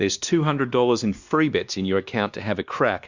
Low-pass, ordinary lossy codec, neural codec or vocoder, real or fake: 7.2 kHz; Opus, 64 kbps; codec, 16 kHz, 2 kbps, X-Codec, WavLM features, trained on Multilingual LibriSpeech; fake